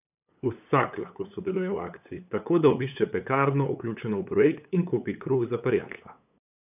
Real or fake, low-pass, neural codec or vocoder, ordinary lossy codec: fake; 3.6 kHz; codec, 16 kHz, 8 kbps, FunCodec, trained on LibriTTS, 25 frames a second; none